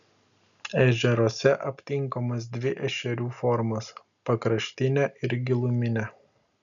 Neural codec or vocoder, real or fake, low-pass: none; real; 7.2 kHz